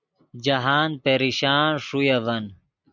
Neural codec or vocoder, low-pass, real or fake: none; 7.2 kHz; real